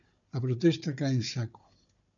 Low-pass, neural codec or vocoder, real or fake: 7.2 kHz; codec, 16 kHz, 4 kbps, FunCodec, trained on Chinese and English, 50 frames a second; fake